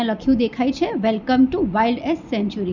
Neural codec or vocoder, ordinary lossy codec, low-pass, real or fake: none; none; 7.2 kHz; real